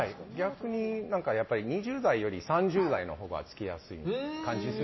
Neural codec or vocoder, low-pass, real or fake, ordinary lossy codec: none; 7.2 kHz; real; MP3, 24 kbps